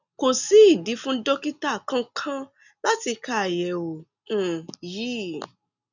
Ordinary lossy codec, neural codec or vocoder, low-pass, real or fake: none; none; 7.2 kHz; real